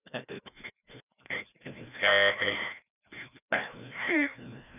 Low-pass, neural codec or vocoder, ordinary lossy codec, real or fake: 3.6 kHz; codec, 16 kHz, 1 kbps, FreqCodec, larger model; none; fake